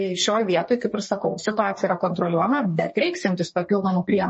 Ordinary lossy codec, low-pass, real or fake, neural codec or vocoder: MP3, 32 kbps; 10.8 kHz; fake; codec, 32 kHz, 1.9 kbps, SNAC